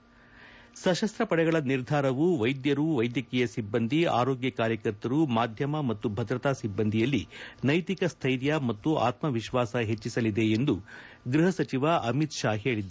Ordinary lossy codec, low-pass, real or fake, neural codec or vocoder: none; none; real; none